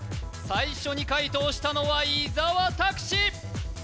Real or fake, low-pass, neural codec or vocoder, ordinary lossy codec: real; none; none; none